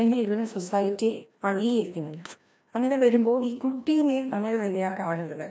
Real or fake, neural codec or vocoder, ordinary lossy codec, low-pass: fake; codec, 16 kHz, 1 kbps, FreqCodec, larger model; none; none